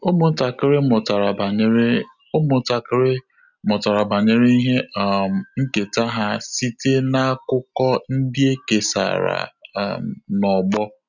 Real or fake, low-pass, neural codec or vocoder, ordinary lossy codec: real; 7.2 kHz; none; none